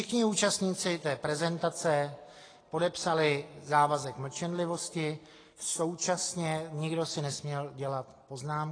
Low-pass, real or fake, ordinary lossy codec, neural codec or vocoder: 9.9 kHz; real; AAC, 32 kbps; none